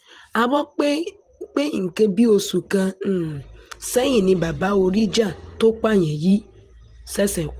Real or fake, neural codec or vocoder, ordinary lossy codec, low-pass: fake; vocoder, 44.1 kHz, 128 mel bands every 512 samples, BigVGAN v2; Opus, 24 kbps; 14.4 kHz